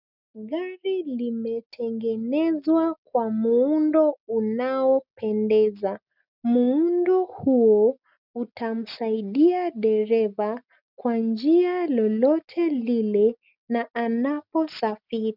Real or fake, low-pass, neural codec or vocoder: real; 5.4 kHz; none